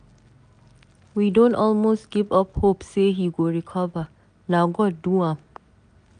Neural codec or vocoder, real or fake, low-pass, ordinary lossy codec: none; real; 9.9 kHz; none